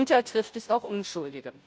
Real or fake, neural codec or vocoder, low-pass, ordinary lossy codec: fake; codec, 16 kHz, 0.5 kbps, FunCodec, trained on Chinese and English, 25 frames a second; none; none